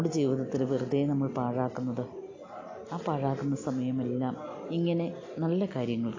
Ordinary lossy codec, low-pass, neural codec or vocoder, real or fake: AAC, 32 kbps; 7.2 kHz; none; real